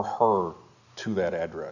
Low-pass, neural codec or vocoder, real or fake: 7.2 kHz; none; real